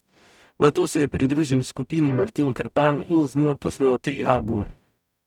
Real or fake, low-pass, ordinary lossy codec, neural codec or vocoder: fake; 19.8 kHz; none; codec, 44.1 kHz, 0.9 kbps, DAC